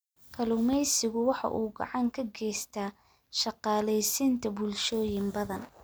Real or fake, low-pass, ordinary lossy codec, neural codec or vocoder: real; none; none; none